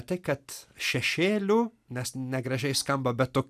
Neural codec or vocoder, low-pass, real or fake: none; 14.4 kHz; real